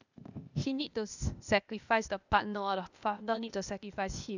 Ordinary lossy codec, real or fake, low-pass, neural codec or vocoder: none; fake; 7.2 kHz; codec, 16 kHz, 0.8 kbps, ZipCodec